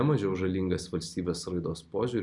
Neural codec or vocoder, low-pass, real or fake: none; 10.8 kHz; real